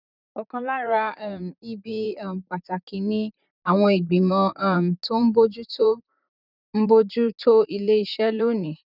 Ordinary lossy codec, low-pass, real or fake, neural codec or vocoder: none; 5.4 kHz; fake; vocoder, 44.1 kHz, 128 mel bands, Pupu-Vocoder